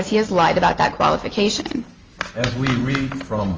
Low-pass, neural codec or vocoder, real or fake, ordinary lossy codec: 7.2 kHz; none; real; Opus, 24 kbps